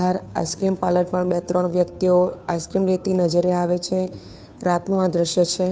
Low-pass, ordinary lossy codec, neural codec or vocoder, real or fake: none; none; codec, 16 kHz, 2 kbps, FunCodec, trained on Chinese and English, 25 frames a second; fake